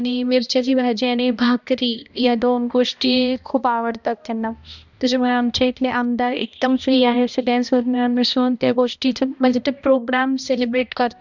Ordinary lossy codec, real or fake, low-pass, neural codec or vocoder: none; fake; 7.2 kHz; codec, 16 kHz, 1 kbps, X-Codec, HuBERT features, trained on balanced general audio